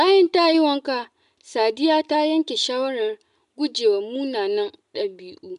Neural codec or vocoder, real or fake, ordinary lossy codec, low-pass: none; real; none; 10.8 kHz